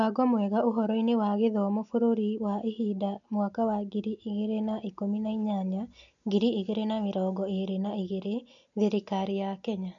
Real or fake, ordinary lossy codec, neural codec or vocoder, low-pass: real; none; none; 7.2 kHz